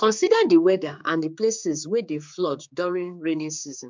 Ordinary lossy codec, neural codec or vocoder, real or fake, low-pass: MP3, 64 kbps; codec, 16 kHz, 4 kbps, X-Codec, HuBERT features, trained on general audio; fake; 7.2 kHz